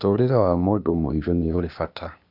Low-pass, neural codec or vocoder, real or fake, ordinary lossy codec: 5.4 kHz; codec, 16 kHz, 0.8 kbps, ZipCodec; fake; none